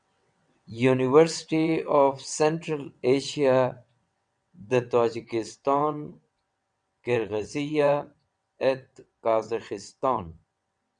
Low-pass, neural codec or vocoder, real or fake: 9.9 kHz; vocoder, 22.05 kHz, 80 mel bands, WaveNeXt; fake